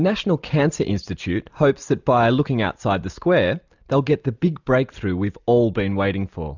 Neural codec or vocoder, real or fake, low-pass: none; real; 7.2 kHz